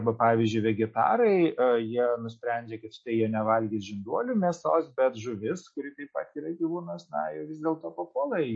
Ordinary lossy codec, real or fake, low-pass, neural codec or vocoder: MP3, 32 kbps; real; 9.9 kHz; none